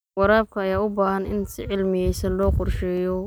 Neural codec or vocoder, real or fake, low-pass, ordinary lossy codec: none; real; none; none